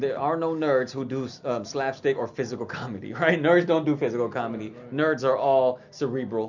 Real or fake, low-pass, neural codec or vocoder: real; 7.2 kHz; none